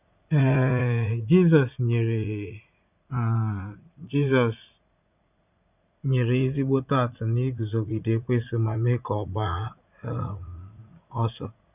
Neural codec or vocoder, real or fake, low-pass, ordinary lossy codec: vocoder, 44.1 kHz, 80 mel bands, Vocos; fake; 3.6 kHz; none